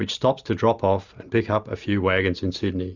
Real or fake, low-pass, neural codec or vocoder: real; 7.2 kHz; none